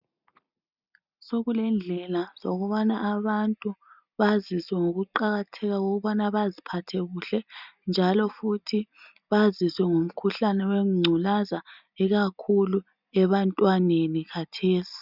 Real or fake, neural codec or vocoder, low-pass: real; none; 5.4 kHz